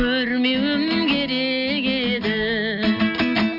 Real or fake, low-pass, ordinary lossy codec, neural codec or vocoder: real; 5.4 kHz; none; none